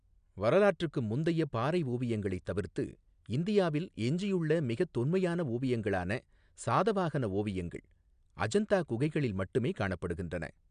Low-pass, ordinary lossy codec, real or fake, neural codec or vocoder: 9.9 kHz; MP3, 96 kbps; real; none